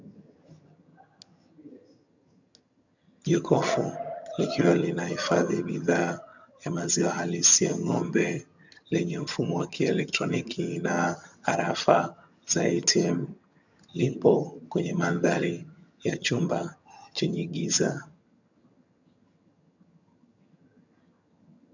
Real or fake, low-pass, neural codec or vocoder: fake; 7.2 kHz; vocoder, 22.05 kHz, 80 mel bands, HiFi-GAN